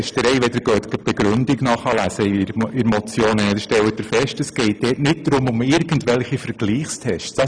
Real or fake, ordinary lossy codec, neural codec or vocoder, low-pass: real; none; none; 9.9 kHz